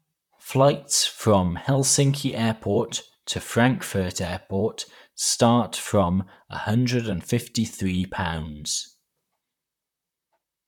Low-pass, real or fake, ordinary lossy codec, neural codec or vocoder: 19.8 kHz; fake; none; vocoder, 44.1 kHz, 128 mel bands, Pupu-Vocoder